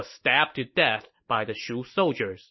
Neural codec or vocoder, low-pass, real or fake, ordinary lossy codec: none; 7.2 kHz; real; MP3, 24 kbps